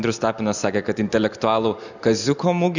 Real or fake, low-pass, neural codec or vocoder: real; 7.2 kHz; none